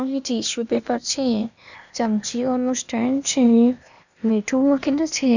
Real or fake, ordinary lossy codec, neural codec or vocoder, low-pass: fake; none; codec, 16 kHz, 0.8 kbps, ZipCodec; 7.2 kHz